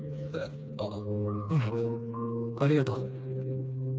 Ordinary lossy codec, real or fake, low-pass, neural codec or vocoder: none; fake; none; codec, 16 kHz, 2 kbps, FreqCodec, smaller model